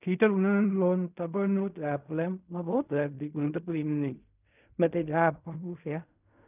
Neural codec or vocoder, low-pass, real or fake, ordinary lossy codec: codec, 16 kHz in and 24 kHz out, 0.4 kbps, LongCat-Audio-Codec, fine tuned four codebook decoder; 3.6 kHz; fake; none